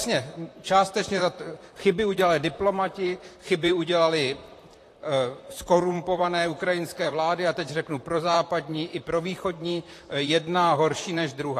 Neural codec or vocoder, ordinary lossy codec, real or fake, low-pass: vocoder, 44.1 kHz, 128 mel bands, Pupu-Vocoder; AAC, 48 kbps; fake; 14.4 kHz